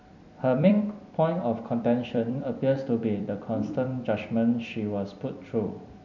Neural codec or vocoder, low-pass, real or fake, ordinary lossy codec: vocoder, 44.1 kHz, 128 mel bands every 256 samples, BigVGAN v2; 7.2 kHz; fake; none